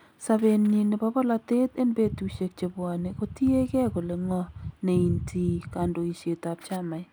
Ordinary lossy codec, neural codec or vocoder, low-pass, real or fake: none; none; none; real